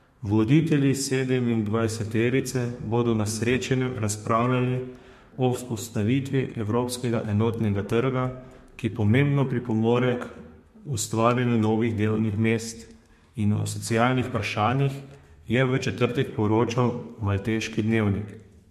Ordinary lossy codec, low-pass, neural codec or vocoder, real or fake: MP3, 64 kbps; 14.4 kHz; codec, 32 kHz, 1.9 kbps, SNAC; fake